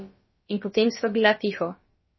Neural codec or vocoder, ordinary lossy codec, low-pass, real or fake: codec, 16 kHz, about 1 kbps, DyCAST, with the encoder's durations; MP3, 24 kbps; 7.2 kHz; fake